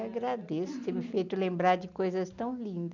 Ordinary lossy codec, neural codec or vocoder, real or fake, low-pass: none; none; real; 7.2 kHz